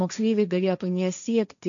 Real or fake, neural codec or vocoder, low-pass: fake; codec, 16 kHz, 1.1 kbps, Voila-Tokenizer; 7.2 kHz